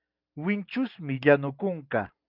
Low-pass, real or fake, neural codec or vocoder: 5.4 kHz; real; none